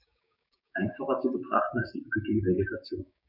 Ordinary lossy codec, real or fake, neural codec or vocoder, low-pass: none; fake; codec, 16 kHz, 6 kbps, DAC; 5.4 kHz